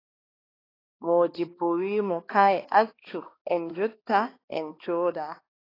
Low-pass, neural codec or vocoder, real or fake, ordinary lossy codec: 5.4 kHz; codec, 16 kHz, 2 kbps, X-Codec, HuBERT features, trained on balanced general audio; fake; AAC, 24 kbps